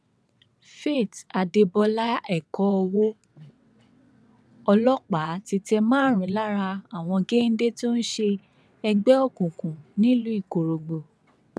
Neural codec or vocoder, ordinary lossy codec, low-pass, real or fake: vocoder, 22.05 kHz, 80 mel bands, WaveNeXt; none; none; fake